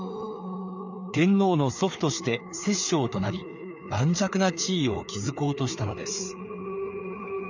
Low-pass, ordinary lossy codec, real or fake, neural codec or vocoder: 7.2 kHz; none; fake; codec, 16 kHz, 4 kbps, FreqCodec, larger model